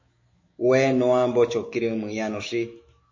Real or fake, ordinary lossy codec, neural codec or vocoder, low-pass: fake; MP3, 32 kbps; autoencoder, 48 kHz, 128 numbers a frame, DAC-VAE, trained on Japanese speech; 7.2 kHz